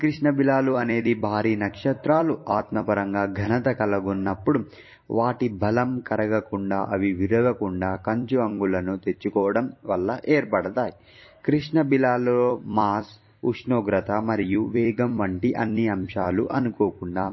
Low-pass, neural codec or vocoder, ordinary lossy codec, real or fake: 7.2 kHz; vocoder, 44.1 kHz, 128 mel bands every 256 samples, BigVGAN v2; MP3, 24 kbps; fake